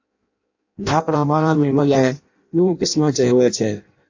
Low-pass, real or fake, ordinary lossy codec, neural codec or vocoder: 7.2 kHz; fake; AAC, 48 kbps; codec, 16 kHz in and 24 kHz out, 0.6 kbps, FireRedTTS-2 codec